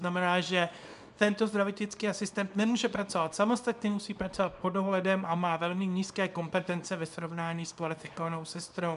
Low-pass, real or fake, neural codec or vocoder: 10.8 kHz; fake; codec, 24 kHz, 0.9 kbps, WavTokenizer, small release